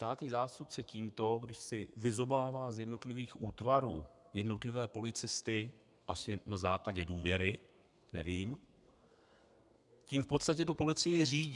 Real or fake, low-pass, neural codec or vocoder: fake; 10.8 kHz; codec, 32 kHz, 1.9 kbps, SNAC